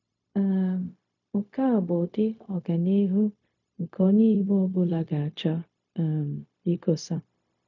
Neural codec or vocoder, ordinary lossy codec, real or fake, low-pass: codec, 16 kHz, 0.4 kbps, LongCat-Audio-Codec; none; fake; 7.2 kHz